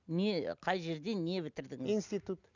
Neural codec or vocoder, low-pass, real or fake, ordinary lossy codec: none; 7.2 kHz; real; none